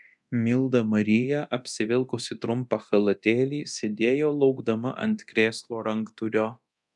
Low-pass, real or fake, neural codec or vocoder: 10.8 kHz; fake; codec, 24 kHz, 0.9 kbps, DualCodec